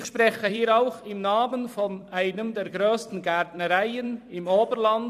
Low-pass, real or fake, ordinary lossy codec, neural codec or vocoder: 14.4 kHz; fake; MP3, 96 kbps; vocoder, 44.1 kHz, 128 mel bands every 256 samples, BigVGAN v2